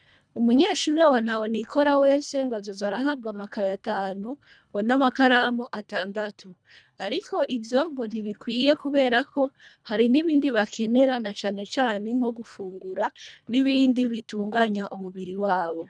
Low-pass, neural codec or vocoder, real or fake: 9.9 kHz; codec, 24 kHz, 1.5 kbps, HILCodec; fake